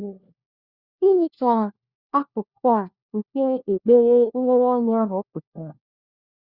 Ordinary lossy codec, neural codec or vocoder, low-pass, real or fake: Opus, 64 kbps; codec, 16 kHz, 1 kbps, FunCodec, trained on LibriTTS, 50 frames a second; 5.4 kHz; fake